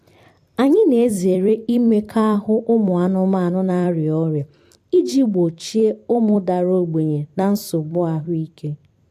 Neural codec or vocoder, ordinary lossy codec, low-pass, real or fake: vocoder, 44.1 kHz, 128 mel bands every 256 samples, BigVGAN v2; AAC, 64 kbps; 14.4 kHz; fake